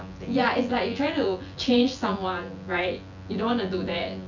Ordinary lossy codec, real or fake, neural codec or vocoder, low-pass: none; fake; vocoder, 24 kHz, 100 mel bands, Vocos; 7.2 kHz